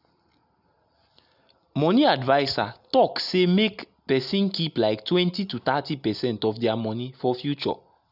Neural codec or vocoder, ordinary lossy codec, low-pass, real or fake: none; none; 5.4 kHz; real